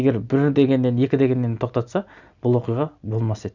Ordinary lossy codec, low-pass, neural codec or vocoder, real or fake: none; 7.2 kHz; none; real